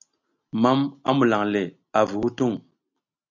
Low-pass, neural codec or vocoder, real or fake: 7.2 kHz; none; real